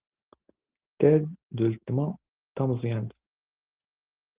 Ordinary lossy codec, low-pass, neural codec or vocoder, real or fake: Opus, 16 kbps; 3.6 kHz; none; real